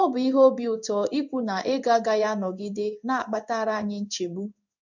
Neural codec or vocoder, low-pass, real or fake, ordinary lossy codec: codec, 16 kHz in and 24 kHz out, 1 kbps, XY-Tokenizer; 7.2 kHz; fake; none